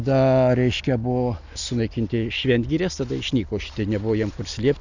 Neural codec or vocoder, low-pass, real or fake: none; 7.2 kHz; real